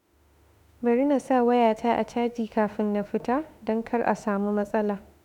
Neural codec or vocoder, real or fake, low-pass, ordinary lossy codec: autoencoder, 48 kHz, 32 numbers a frame, DAC-VAE, trained on Japanese speech; fake; 19.8 kHz; none